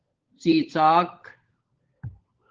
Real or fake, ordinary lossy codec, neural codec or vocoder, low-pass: fake; Opus, 16 kbps; codec, 16 kHz, 16 kbps, FunCodec, trained on LibriTTS, 50 frames a second; 7.2 kHz